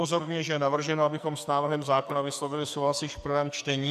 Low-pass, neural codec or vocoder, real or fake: 14.4 kHz; codec, 44.1 kHz, 2.6 kbps, SNAC; fake